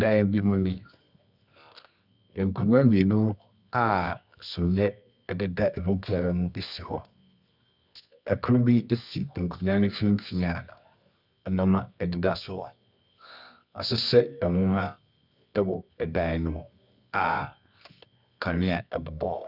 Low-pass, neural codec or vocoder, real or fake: 5.4 kHz; codec, 24 kHz, 0.9 kbps, WavTokenizer, medium music audio release; fake